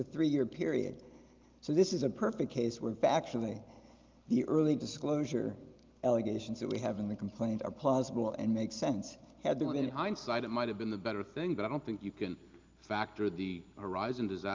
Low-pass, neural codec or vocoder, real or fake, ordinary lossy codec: 7.2 kHz; none; real; Opus, 32 kbps